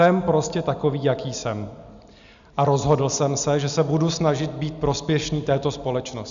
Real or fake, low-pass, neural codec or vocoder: real; 7.2 kHz; none